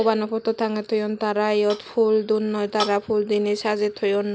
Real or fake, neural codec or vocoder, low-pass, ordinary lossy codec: real; none; none; none